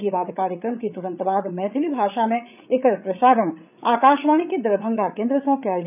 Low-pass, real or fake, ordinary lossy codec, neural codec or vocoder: 3.6 kHz; fake; none; codec, 16 kHz, 8 kbps, FreqCodec, larger model